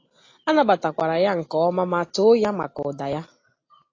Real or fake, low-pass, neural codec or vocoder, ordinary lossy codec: real; 7.2 kHz; none; AAC, 32 kbps